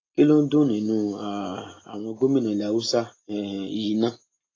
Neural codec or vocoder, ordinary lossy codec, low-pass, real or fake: none; AAC, 32 kbps; 7.2 kHz; real